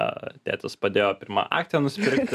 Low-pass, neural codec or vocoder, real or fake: 14.4 kHz; none; real